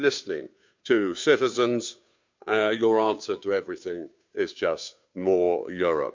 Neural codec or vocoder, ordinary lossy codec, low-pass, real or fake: codec, 16 kHz, 2 kbps, FunCodec, trained on Chinese and English, 25 frames a second; MP3, 64 kbps; 7.2 kHz; fake